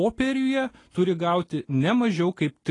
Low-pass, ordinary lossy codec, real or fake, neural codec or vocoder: 10.8 kHz; AAC, 32 kbps; real; none